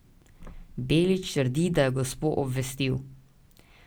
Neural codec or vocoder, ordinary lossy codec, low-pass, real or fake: none; none; none; real